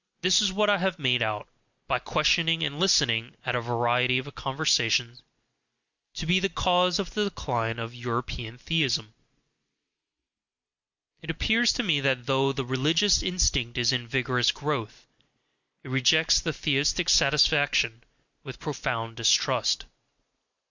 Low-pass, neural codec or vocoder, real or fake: 7.2 kHz; none; real